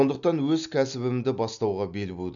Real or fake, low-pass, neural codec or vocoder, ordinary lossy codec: real; 7.2 kHz; none; none